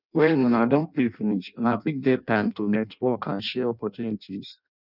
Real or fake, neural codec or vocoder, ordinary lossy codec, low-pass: fake; codec, 16 kHz in and 24 kHz out, 0.6 kbps, FireRedTTS-2 codec; none; 5.4 kHz